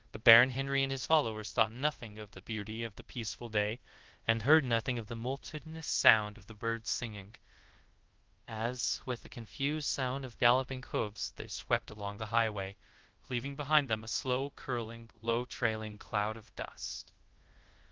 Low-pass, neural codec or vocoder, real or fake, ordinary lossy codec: 7.2 kHz; codec, 24 kHz, 0.5 kbps, DualCodec; fake; Opus, 16 kbps